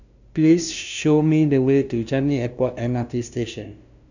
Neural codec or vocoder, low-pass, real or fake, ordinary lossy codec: codec, 16 kHz, 0.5 kbps, FunCodec, trained on LibriTTS, 25 frames a second; 7.2 kHz; fake; none